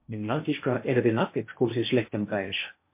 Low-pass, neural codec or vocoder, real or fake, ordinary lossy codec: 3.6 kHz; codec, 16 kHz in and 24 kHz out, 0.8 kbps, FocalCodec, streaming, 65536 codes; fake; MP3, 24 kbps